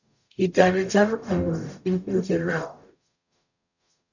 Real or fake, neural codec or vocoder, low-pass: fake; codec, 44.1 kHz, 0.9 kbps, DAC; 7.2 kHz